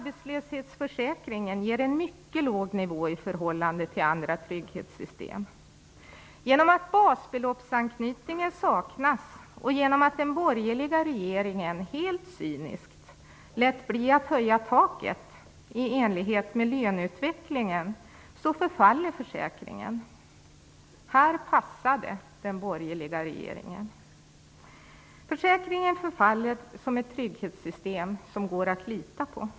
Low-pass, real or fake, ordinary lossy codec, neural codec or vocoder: none; real; none; none